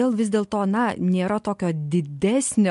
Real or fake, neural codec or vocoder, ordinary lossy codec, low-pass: real; none; MP3, 64 kbps; 10.8 kHz